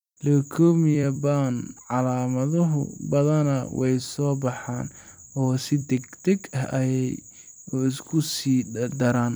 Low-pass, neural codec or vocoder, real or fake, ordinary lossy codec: none; vocoder, 44.1 kHz, 128 mel bands every 256 samples, BigVGAN v2; fake; none